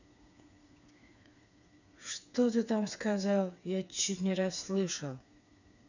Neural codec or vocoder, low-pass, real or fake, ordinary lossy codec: codec, 16 kHz, 4 kbps, FreqCodec, smaller model; 7.2 kHz; fake; none